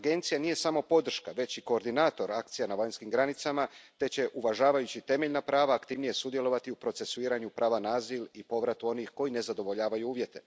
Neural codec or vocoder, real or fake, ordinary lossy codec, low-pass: none; real; none; none